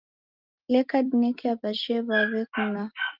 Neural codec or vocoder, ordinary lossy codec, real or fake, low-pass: none; Opus, 24 kbps; real; 5.4 kHz